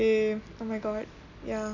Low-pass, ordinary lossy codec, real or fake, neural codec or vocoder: 7.2 kHz; none; real; none